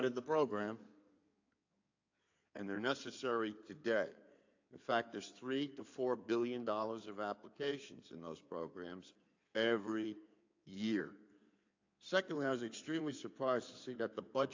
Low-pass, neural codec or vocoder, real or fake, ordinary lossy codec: 7.2 kHz; codec, 16 kHz in and 24 kHz out, 2.2 kbps, FireRedTTS-2 codec; fake; AAC, 48 kbps